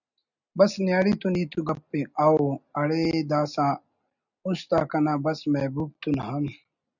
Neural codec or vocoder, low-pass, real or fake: none; 7.2 kHz; real